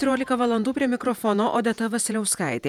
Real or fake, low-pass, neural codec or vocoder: fake; 19.8 kHz; vocoder, 48 kHz, 128 mel bands, Vocos